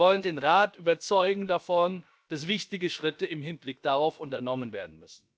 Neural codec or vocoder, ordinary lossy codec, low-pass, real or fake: codec, 16 kHz, about 1 kbps, DyCAST, with the encoder's durations; none; none; fake